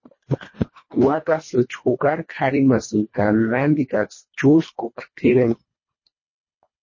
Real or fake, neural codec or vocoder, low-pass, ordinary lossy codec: fake; codec, 24 kHz, 1.5 kbps, HILCodec; 7.2 kHz; MP3, 32 kbps